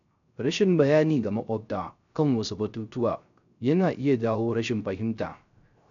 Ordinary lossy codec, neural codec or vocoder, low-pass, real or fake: MP3, 96 kbps; codec, 16 kHz, 0.3 kbps, FocalCodec; 7.2 kHz; fake